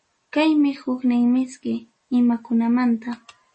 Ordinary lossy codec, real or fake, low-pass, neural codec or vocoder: MP3, 32 kbps; real; 10.8 kHz; none